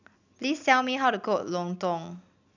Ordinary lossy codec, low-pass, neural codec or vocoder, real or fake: none; 7.2 kHz; none; real